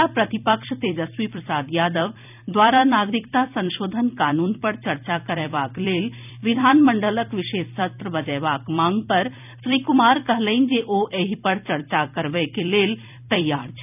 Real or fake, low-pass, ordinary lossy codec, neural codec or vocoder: fake; 3.6 kHz; none; vocoder, 44.1 kHz, 128 mel bands every 256 samples, BigVGAN v2